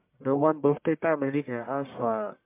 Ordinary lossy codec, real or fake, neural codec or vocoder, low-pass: MP3, 32 kbps; fake; codec, 44.1 kHz, 1.7 kbps, Pupu-Codec; 3.6 kHz